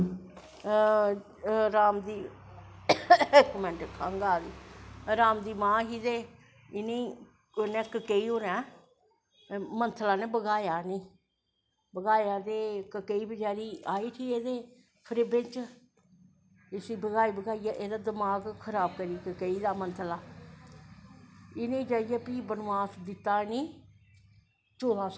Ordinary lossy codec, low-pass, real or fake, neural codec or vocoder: none; none; real; none